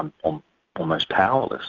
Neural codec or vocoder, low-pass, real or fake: none; 7.2 kHz; real